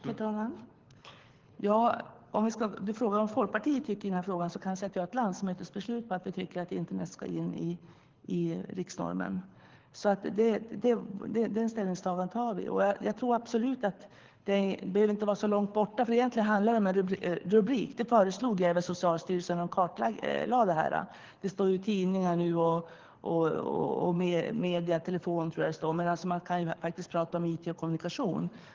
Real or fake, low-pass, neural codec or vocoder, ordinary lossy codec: fake; 7.2 kHz; codec, 24 kHz, 6 kbps, HILCodec; Opus, 16 kbps